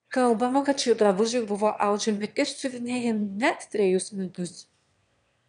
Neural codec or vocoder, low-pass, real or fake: autoencoder, 22.05 kHz, a latent of 192 numbers a frame, VITS, trained on one speaker; 9.9 kHz; fake